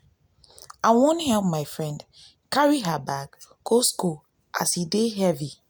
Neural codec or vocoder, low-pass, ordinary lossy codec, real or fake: none; none; none; real